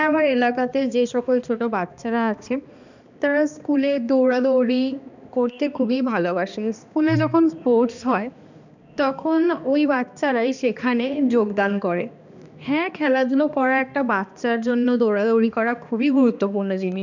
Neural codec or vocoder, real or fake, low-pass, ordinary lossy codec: codec, 16 kHz, 2 kbps, X-Codec, HuBERT features, trained on balanced general audio; fake; 7.2 kHz; none